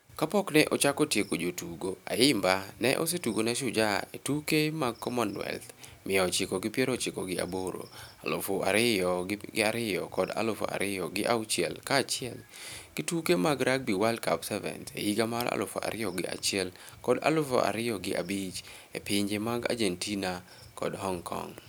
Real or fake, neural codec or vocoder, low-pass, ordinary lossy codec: real; none; none; none